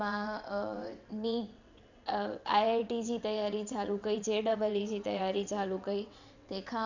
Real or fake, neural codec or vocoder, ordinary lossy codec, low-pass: fake; vocoder, 22.05 kHz, 80 mel bands, WaveNeXt; none; 7.2 kHz